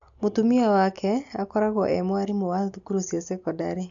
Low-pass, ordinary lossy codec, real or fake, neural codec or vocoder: 7.2 kHz; AAC, 64 kbps; real; none